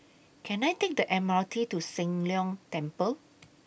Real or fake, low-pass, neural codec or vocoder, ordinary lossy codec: real; none; none; none